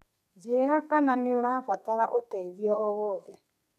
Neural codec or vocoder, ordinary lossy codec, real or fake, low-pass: codec, 32 kHz, 1.9 kbps, SNAC; MP3, 96 kbps; fake; 14.4 kHz